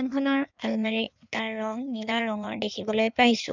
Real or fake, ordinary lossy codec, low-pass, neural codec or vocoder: fake; none; 7.2 kHz; codec, 16 kHz in and 24 kHz out, 1.1 kbps, FireRedTTS-2 codec